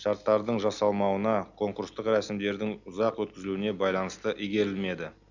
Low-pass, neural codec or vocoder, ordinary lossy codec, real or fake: 7.2 kHz; none; none; real